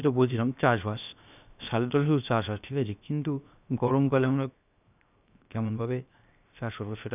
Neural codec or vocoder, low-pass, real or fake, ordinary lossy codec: codec, 16 kHz, 0.7 kbps, FocalCodec; 3.6 kHz; fake; none